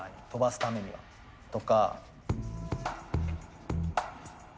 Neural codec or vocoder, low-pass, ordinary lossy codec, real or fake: none; none; none; real